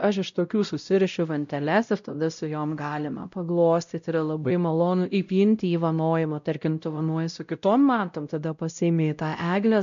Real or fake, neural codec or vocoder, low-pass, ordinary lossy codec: fake; codec, 16 kHz, 0.5 kbps, X-Codec, WavLM features, trained on Multilingual LibriSpeech; 7.2 kHz; MP3, 64 kbps